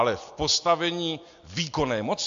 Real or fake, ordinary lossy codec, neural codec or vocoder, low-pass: real; AAC, 64 kbps; none; 7.2 kHz